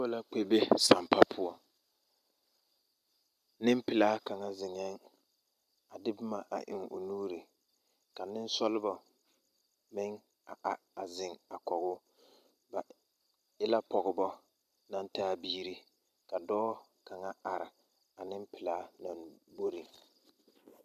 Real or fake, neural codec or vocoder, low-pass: fake; vocoder, 44.1 kHz, 128 mel bands every 256 samples, BigVGAN v2; 14.4 kHz